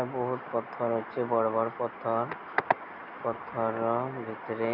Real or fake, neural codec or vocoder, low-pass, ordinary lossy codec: real; none; 5.4 kHz; none